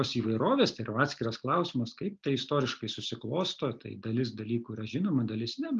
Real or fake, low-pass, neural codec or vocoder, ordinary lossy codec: real; 7.2 kHz; none; Opus, 32 kbps